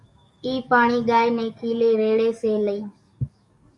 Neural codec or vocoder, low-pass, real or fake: codec, 44.1 kHz, 7.8 kbps, DAC; 10.8 kHz; fake